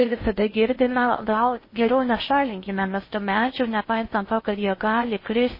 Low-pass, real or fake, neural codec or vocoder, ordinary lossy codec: 5.4 kHz; fake; codec, 16 kHz in and 24 kHz out, 0.6 kbps, FocalCodec, streaming, 4096 codes; MP3, 24 kbps